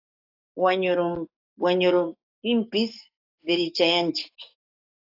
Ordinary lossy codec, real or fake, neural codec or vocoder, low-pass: AAC, 32 kbps; fake; codec, 44.1 kHz, 7.8 kbps, DAC; 5.4 kHz